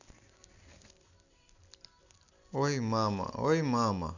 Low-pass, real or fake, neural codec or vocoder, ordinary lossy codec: 7.2 kHz; real; none; none